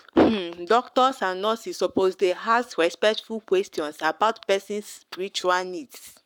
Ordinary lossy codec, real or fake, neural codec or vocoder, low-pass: none; fake; codec, 44.1 kHz, 7.8 kbps, Pupu-Codec; 19.8 kHz